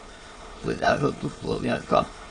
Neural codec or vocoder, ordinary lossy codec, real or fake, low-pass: autoencoder, 22.05 kHz, a latent of 192 numbers a frame, VITS, trained on many speakers; AAC, 32 kbps; fake; 9.9 kHz